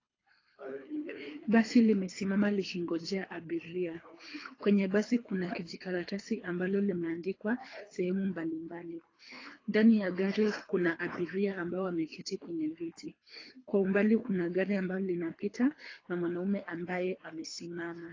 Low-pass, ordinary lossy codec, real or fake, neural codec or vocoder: 7.2 kHz; AAC, 32 kbps; fake; codec, 24 kHz, 3 kbps, HILCodec